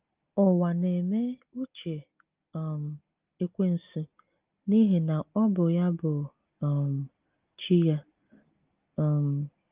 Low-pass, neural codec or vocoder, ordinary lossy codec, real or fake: 3.6 kHz; none; Opus, 32 kbps; real